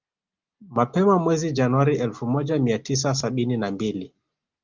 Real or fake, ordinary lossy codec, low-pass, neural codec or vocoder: real; Opus, 32 kbps; 7.2 kHz; none